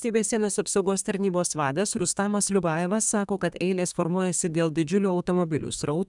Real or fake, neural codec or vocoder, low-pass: fake; codec, 32 kHz, 1.9 kbps, SNAC; 10.8 kHz